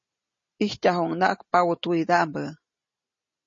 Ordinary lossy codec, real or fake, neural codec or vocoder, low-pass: MP3, 32 kbps; real; none; 7.2 kHz